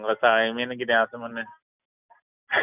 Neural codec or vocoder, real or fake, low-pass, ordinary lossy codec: none; real; 3.6 kHz; none